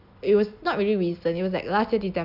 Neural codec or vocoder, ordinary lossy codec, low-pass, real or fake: none; none; 5.4 kHz; real